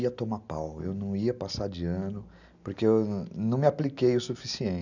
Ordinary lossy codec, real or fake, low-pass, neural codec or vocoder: none; real; 7.2 kHz; none